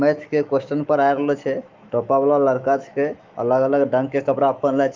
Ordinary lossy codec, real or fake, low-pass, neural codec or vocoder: Opus, 24 kbps; fake; 7.2 kHz; autoencoder, 48 kHz, 128 numbers a frame, DAC-VAE, trained on Japanese speech